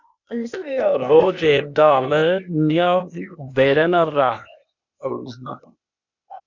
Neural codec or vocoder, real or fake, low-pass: codec, 16 kHz, 0.8 kbps, ZipCodec; fake; 7.2 kHz